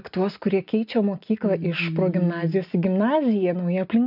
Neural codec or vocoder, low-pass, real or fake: none; 5.4 kHz; real